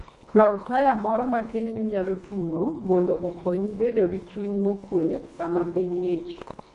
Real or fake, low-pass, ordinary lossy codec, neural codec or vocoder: fake; 10.8 kHz; none; codec, 24 kHz, 1.5 kbps, HILCodec